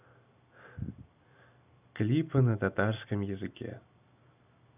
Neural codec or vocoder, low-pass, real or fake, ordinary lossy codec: none; 3.6 kHz; real; none